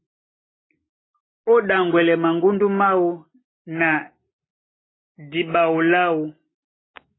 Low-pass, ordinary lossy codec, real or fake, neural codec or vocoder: 7.2 kHz; AAC, 16 kbps; real; none